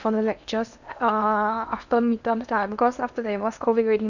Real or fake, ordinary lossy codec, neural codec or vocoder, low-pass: fake; none; codec, 16 kHz in and 24 kHz out, 0.8 kbps, FocalCodec, streaming, 65536 codes; 7.2 kHz